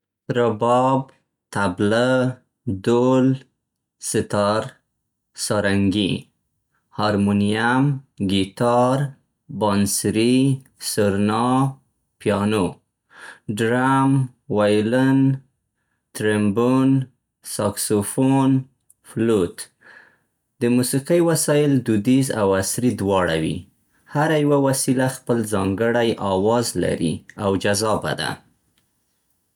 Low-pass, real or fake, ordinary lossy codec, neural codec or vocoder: 19.8 kHz; real; none; none